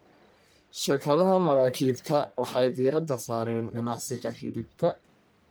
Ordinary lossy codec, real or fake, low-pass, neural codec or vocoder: none; fake; none; codec, 44.1 kHz, 1.7 kbps, Pupu-Codec